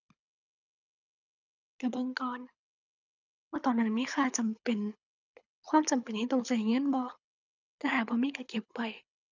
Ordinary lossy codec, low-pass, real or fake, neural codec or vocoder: none; 7.2 kHz; fake; codec, 24 kHz, 6 kbps, HILCodec